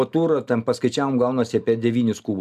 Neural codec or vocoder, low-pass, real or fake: none; 14.4 kHz; real